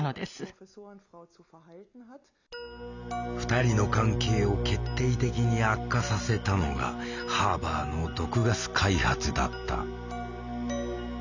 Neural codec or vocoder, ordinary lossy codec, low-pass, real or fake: none; none; 7.2 kHz; real